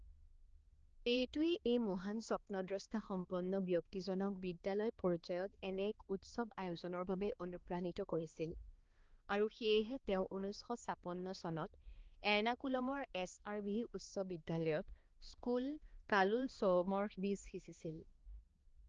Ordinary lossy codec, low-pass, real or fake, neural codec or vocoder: Opus, 16 kbps; 7.2 kHz; fake; codec, 16 kHz, 2 kbps, X-Codec, HuBERT features, trained on balanced general audio